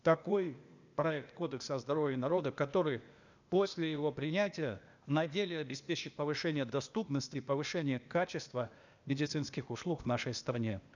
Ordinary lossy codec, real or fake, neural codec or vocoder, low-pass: none; fake; codec, 16 kHz, 0.8 kbps, ZipCodec; 7.2 kHz